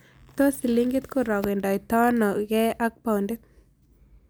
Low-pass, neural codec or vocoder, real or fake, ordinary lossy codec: none; none; real; none